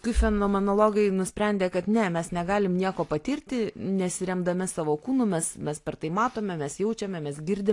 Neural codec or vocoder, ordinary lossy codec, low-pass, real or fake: none; AAC, 48 kbps; 10.8 kHz; real